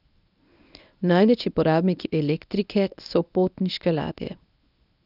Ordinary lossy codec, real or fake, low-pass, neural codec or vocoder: none; fake; 5.4 kHz; codec, 24 kHz, 0.9 kbps, WavTokenizer, medium speech release version 1